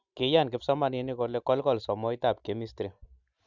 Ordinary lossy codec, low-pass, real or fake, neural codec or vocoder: none; 7.2 kHz; real; none